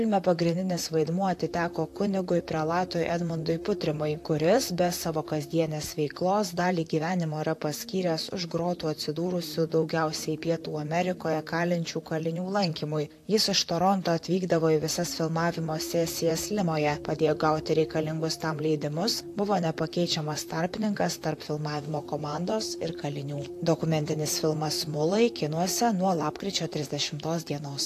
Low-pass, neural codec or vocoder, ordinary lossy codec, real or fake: 14.4 kHz; vocoder, 44.1 kHz, 128 mel bands, Pupu-Vocoder; AAC, 64 kbps; fake